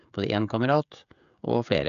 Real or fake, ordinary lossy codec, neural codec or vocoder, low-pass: fake; none; codec, 16 kHz, 16 kbps, FreqCodec, smaller model; 7.2 kHz